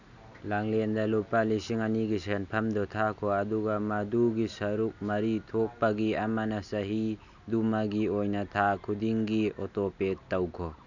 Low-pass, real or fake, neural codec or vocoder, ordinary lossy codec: 7.2 kHz; real; none; none